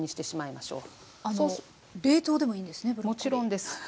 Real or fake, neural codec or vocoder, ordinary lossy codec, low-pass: real; none; none; none